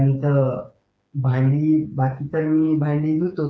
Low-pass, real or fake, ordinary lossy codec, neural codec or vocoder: none; fake; none; codec, 16 kHz, 4 kbps, FreqCodec, smaller model